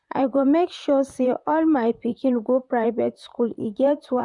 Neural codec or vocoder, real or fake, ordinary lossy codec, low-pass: vocoder, 44.1 kHz, 128 mel bands, Pupu-Vocoder; fake; none; 10.8 kHz